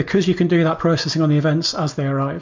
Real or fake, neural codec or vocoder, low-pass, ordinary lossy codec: real; none; 7.2 kHz; MP3, 48 kbps